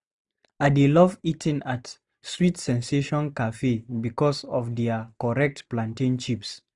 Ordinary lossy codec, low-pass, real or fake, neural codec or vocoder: none; 10.8 kHz; real; none